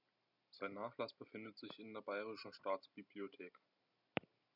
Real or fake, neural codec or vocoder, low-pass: real; none; 5.4 kHz